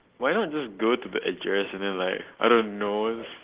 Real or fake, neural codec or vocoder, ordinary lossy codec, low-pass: real; none; Opus, 16 kbps; 3.6 kHz